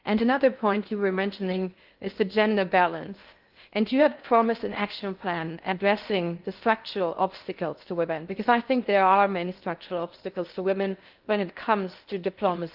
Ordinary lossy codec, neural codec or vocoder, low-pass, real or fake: Opus, 32 kbps; codec, 16 kHz in and 24 kHz out, 0.6 kbps, FocalCodec, streaming, 2048 codes; 5.4 kHz; fake